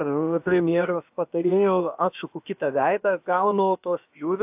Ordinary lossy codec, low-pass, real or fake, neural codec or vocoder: AAC, 32 kbps; 3.6 kHz; fake; codec, 16 kHz, about 1 kbps, DyCAST, with the encoder's durations